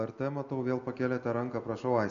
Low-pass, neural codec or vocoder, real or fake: 7.2 kHz; none; real